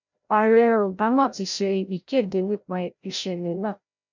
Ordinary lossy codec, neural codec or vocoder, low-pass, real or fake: none; codec, 16 kHz, 0.5 kbps, FreqCodec, larger model; 7.2 kHz; fake